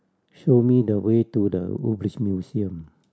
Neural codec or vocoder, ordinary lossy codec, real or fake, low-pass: none; none; real; none